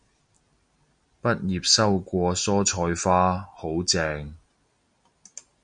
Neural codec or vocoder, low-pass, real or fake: none; 9.9 kHz; real